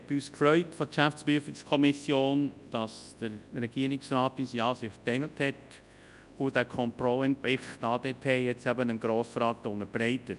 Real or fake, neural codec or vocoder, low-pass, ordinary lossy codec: fake; codec, 24 kHz, 0.9 kbps, WavTokenizer, large speech release; 10.8 kHz; none